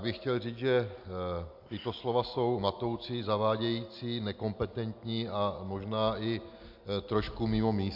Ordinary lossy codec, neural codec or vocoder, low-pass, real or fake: MP3, 48 kbps; none; 5.4 kHz; real